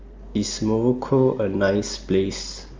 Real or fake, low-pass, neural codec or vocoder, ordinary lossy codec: real; 7.2 kHz; none; Opus, 32 kbps